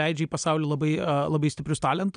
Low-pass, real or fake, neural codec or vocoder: 9.9 kHz; real; none